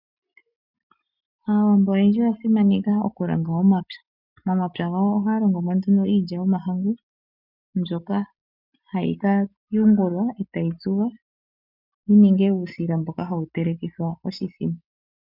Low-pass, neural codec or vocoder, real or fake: 5.4 kHz; none; real